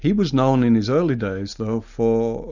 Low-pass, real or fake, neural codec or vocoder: 7.2 kHz; real; none